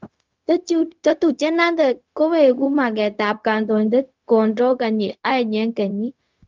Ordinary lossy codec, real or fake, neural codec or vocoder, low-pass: Opus, 32 kbps; fake; codec, 16 kHz, 0.4 kbps, LongCat-Audio-Codec; 7.2 kHz